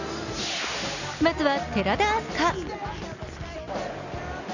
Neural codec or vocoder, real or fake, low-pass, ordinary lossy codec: none; real; 7.2 kHz; none